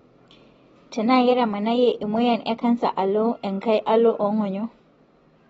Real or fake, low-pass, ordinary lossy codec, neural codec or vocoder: real; 19.8 kHz; AAC, 24 kbps; none